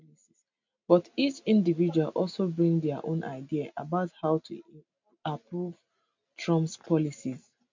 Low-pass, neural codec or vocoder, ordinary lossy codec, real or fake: 7.2 kHz; none; MP3, 64 kbps; real